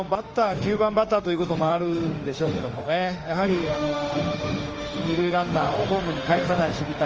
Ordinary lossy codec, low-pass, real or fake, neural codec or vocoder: Opus, 24 kbps; 7.2 kHz; fake; autoencoder, 48 kHz, 32 numbers a frame, DAC-VAE, trained on Japanese speech